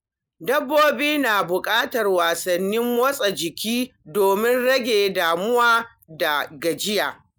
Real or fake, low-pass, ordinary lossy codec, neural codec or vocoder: real; none; none; none